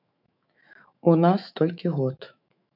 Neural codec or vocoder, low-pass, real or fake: autoencoder, 48 kHz, 128 numbers a frame, DAC-VAE, trained on Japanese speech; 5.4 kHz; fake